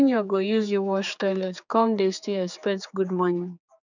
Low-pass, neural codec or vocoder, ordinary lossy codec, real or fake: 7.2 kHz; codec, 16 kHz, 4 kbps, X-Codec, HuBERT features, trained on general audio; none; fake